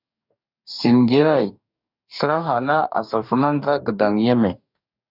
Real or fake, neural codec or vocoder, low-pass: fake; codec, 44.1 kHz, 2.6 kbps, DAC; 5.4 kHz